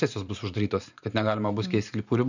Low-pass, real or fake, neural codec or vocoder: 7.2 kHz; fake; vocoder, 44.1 kHz, 128 mel bands every 512 samples, BigVGAN v2